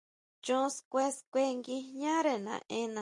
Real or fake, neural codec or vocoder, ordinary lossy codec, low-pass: real; none; MP3, 48 kbps; 10.8 kHz